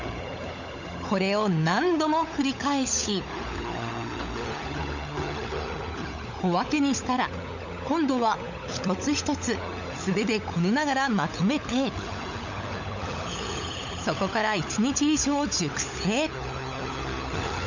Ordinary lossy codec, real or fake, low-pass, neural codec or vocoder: none; fake; 7.2 kHz; codec, 16 kHz, 16 kbps, FunCodec, trained on LibriTTS, 50 frames a second